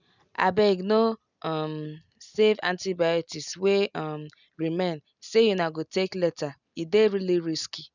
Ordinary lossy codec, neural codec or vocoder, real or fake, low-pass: none; none; real; 7.2 kHz